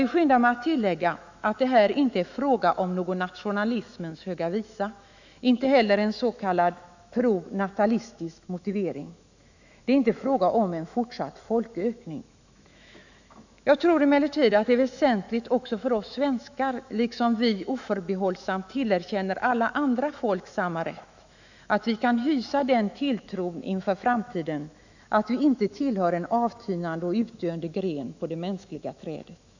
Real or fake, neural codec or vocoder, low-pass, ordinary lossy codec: fake; autoencoder, 48 kHz, 128 numbers a frame, DAC-VAE, trained on Japanese speech; 7.2 kHz; none